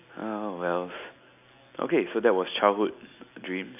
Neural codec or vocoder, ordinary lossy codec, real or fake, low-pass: none; none; real; 3.6 kHz